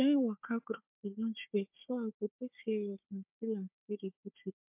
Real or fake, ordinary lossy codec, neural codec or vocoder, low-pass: fake; MP3, 32 kbps; codec, 16 kHz, 4 kbps, FunCodec, trained on Chinese and English, 50 frames a second; 3.6 kHz